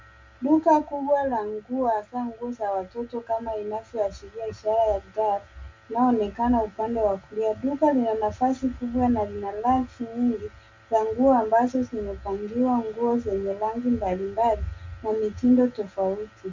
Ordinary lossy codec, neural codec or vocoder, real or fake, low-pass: MP3, 64 kbps; none; real; 7.2 kHz